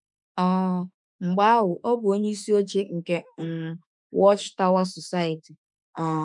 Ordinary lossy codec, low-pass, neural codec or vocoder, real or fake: none; 10.8 kHz; autoencoder, 48 kHz, 32 numbers a frame, DAC-VAE, trained on Japanese speech; fake